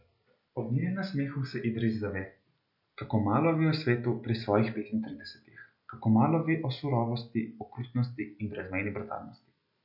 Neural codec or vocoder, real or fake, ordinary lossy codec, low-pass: none; real; none; 5.4 kHz